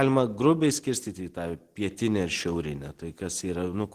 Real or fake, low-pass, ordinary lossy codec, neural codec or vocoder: real; 14.4 kHz; Opus, 16 kbps; none